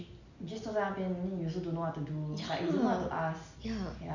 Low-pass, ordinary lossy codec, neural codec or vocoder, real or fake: 7.2 kHz; none; none; real